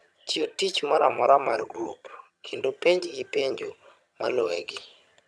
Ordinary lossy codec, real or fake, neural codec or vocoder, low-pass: none; fake; vocoder, 22.05 kHz, 80 mel bands, HiFi-GAN; none